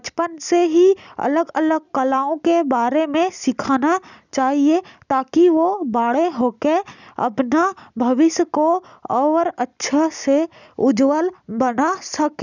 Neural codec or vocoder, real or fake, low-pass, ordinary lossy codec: none; real; 7.2 kHz; none